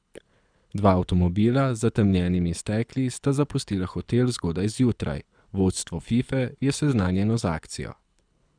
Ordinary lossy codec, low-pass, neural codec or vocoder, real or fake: none; 9.9 kHz; codec, 24 kHz, 6 kbps, HILCodec; fake